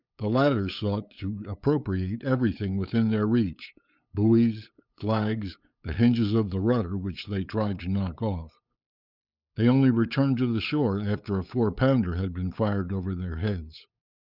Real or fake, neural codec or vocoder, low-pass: fake; codec, 16 kHz, 8 kbps, FunCodec, trained on LibriTTS, 25 frames a second; 5.4 kHz